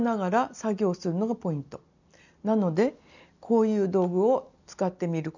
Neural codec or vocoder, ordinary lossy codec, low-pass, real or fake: none; none; 7.2 kHz; real